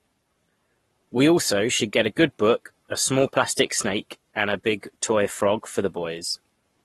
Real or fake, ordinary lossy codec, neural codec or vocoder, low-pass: fake; AAC, 32 kbps; codec, 44.1 kHz, 7.8 kbps, Pupu-Codec; 19.8 kHz